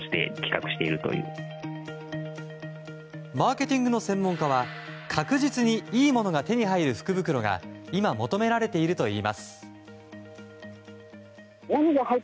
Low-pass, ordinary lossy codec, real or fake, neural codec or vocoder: none; none; real; none